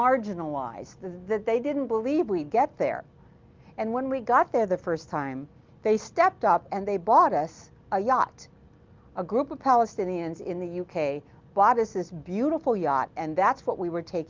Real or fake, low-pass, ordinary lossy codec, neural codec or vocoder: real; 7.2 kHz; Opus, 32 kbps; none